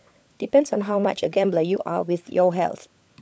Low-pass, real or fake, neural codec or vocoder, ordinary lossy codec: none; fake; codec, 16 kHz, 16 kbps, FunCodec, trained on LibriTTS, 50 frames a second; none